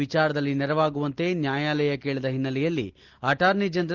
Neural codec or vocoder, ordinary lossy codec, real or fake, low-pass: none; Opus, 16 kbps; real; 7.2 kHz